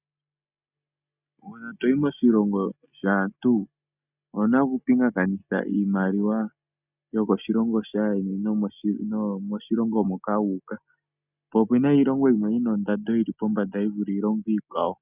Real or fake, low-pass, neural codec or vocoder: real; 3.6 kHz; none